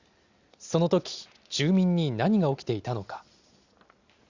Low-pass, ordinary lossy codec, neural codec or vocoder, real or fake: 7.2 kHz; Opus, 64 kbps; none; real